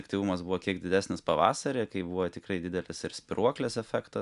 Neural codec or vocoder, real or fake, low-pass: none; real; 10.8 kHz